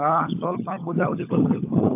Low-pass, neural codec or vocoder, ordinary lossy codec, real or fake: 3.6 kHz; codec, 16 kHz, 16 kbps, FunCodec, trained on LibriTTS, 50 frames a second; AAC, 24 kbps; fake